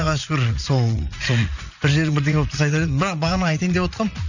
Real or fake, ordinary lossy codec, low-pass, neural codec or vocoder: fake; none; 7.2 kHz; vocoder, 44.1 kHz, 80 mel bands, Vocos